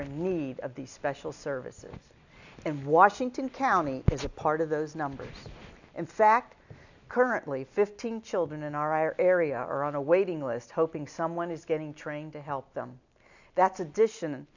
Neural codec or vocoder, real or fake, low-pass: none; real; 7.2 kHz